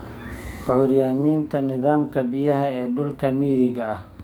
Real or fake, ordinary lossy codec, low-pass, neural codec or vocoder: fake; none; none; codec, 44.1 kHz, 2.6 kbps, SNAC